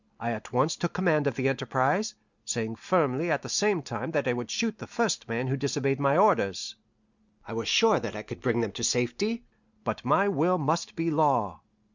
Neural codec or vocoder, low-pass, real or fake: none; 7.2 kHz; real